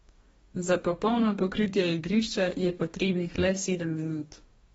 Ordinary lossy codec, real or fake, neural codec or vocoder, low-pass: AAC, 24 kbps; fake; codec, 44.1 kHz, 2.6 kbps, DAC; 19.8 kHz